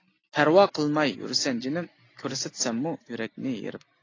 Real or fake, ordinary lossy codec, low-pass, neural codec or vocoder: real; AAC, 32 kbps; 7.2 kHz; none